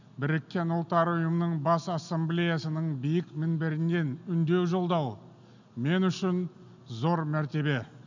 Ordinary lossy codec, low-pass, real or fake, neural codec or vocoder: none; 7.2 kHz; real; none